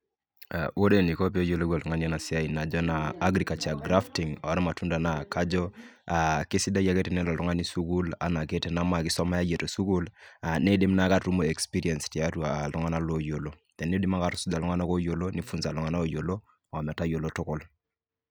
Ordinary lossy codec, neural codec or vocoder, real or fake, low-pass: none; none; real; none